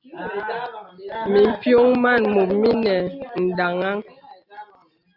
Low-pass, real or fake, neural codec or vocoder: 5.4 kHz; real; none